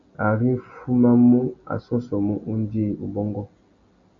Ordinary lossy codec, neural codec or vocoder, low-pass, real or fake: MP3, 48 kbps; none; 7.2 kHz; real